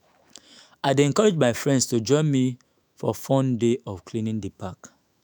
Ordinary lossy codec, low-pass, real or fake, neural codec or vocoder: none; none; fake; autoencoder, 48 kHz, 128 numbers a frame, DAC-VAE, trained on Japanese speech